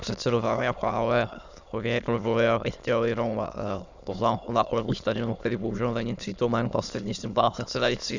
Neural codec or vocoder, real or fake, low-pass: autoencoder, 22.05 kHz, a latent of 192 numbers a frame, VITS, trained on many speakers; fake; 7.2 kHz